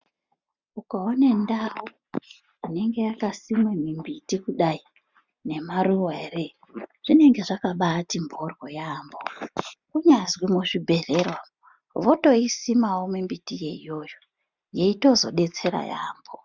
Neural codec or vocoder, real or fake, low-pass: none; real; 7.2 kHz